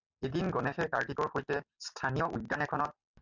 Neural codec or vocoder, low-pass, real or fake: none; 7.2 kHz; real